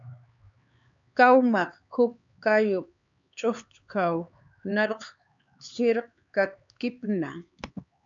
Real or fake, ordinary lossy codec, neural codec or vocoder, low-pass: fake; MP3, 64 kbps; codec, 16 kHz, 4 kbps, X-Codec, HuBERT features, trained on LibriSpeech; 7.2 kHz